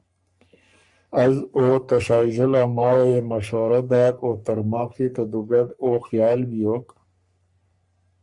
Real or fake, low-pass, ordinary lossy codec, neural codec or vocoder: fake; 10.8 kHz; MP3, 96 kbps; codec, 44.1 kHz, 3.4 kbps, Pupu-Codec